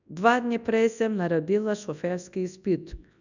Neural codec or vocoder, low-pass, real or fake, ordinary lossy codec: codec, 24 kHz, 0.9 kbps, WavTokenizer, large speech release; 7.2 kHz; fake; none